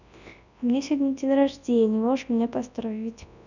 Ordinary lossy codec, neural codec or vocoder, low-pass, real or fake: none; codec, 24 kHz, 0.9 kbps, WavTokenizer, large speech release; 7.2 kHz; fake